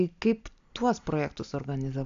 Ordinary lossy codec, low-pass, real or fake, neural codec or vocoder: AAC, 64 kbps; 7.2 kHz; real; none